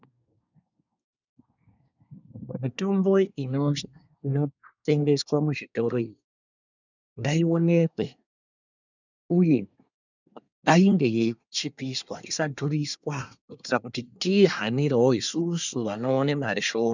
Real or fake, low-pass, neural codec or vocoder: fake; 7.2 kHz; codec, 24 kHz, 1 kbps, SNAC